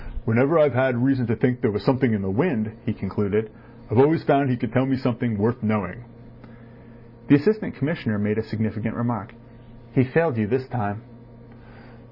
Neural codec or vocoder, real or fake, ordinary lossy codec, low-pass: none; real; Opus, 64 kbps; 5.4 kHz